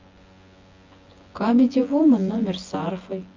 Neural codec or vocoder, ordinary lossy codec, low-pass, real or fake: vocoder, 24 kHz, 100 mel bands, Vocos; Opus, 32 kbps; 7.2 kHz; fake